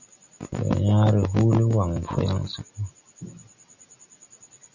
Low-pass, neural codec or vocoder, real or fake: 7.2 kHz; none; real